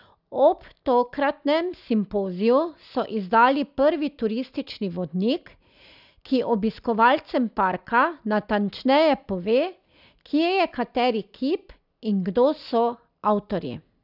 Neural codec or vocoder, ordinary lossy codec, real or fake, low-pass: none; none; real; 5.4 kHz